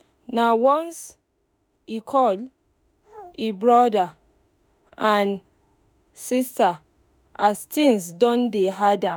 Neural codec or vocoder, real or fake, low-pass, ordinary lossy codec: autoencoder, 48 kHz, 32 numbers a frame, DAC-VAE, trained on Japanese speech; fake; none; none